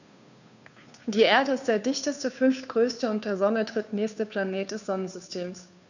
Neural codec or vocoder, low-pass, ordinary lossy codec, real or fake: codec, 16 kHz, 2 kbps, FunCodec, trained on Chinese and English, 25 frames a second; 7.2 kHz; none; fake